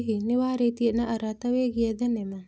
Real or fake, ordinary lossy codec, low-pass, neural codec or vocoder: real; none; none; none